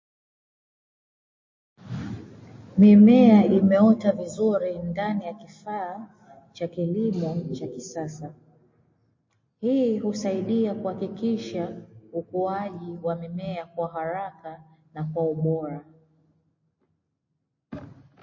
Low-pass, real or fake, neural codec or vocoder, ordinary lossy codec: 7.2 kHz; real; none; MP3, 32 kbps